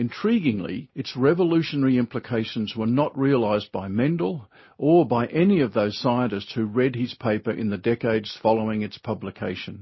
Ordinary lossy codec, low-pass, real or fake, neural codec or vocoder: MP3, 24 kbps; 7.2 kHz; real; none